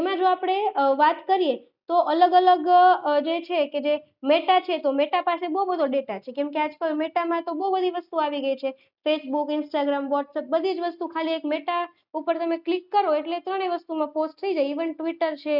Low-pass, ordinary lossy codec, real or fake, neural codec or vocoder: 5.4 kHz; none; real; none